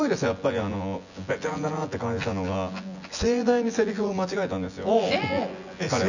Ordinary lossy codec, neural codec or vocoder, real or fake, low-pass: none; vocoder, 24 kHz, 100 mel bands, Vocos; fake; 7.2 kHz